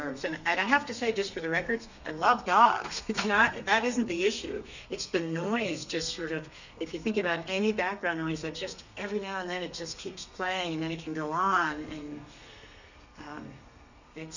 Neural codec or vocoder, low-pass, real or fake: codec, 32 kHz, 1.9 kbps, SNAC; 7.2 kHz; fake